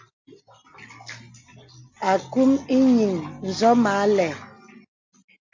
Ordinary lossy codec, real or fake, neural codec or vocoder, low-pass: MP3, 48 kbps; real; none; 7.2 kHz